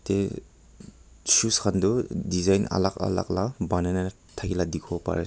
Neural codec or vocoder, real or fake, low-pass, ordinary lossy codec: none; real; none; none